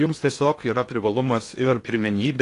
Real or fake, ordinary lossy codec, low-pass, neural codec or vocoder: fake; AAC, 48 kbps; 10.8 kHz; codec, 16 kHz in and 24 kHz out, 0.8 kbps, FocalCodec, streaming, 65536 codes